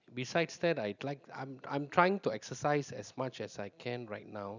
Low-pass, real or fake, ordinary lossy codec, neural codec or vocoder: 7.2 kHz; real; none; none